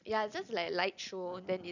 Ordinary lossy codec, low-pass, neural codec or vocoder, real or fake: none; 7.2 kHz; none; real